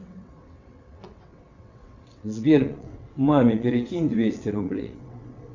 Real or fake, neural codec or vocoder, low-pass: fake; vocoder, 22.05 kHz, 80 mel bands, WaveNeXt; 7.2 kHz